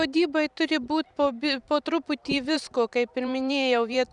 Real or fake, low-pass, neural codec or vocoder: fake; 10.8 kHz; vocoder, 44.1 kHz, 128 mel bands every 512 samples, BigVGAN v2